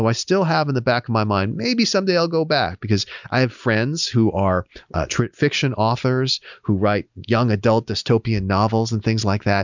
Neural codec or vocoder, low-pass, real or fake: none; 7.2 kHz; real